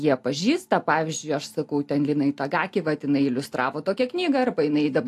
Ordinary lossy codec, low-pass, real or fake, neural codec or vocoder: AAC, 64 kbps; 14.4 kHz; real; none